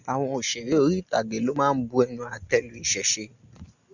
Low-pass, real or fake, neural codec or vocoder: 7.2 kHz; real; none